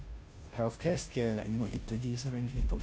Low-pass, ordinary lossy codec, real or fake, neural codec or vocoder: none; none; fake; codec, 16 kHz, 0.5 kbps, FunCodec, trained on Chinese and English, 25 frames a second